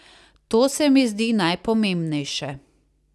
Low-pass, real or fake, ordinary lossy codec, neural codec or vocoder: none; real; none; none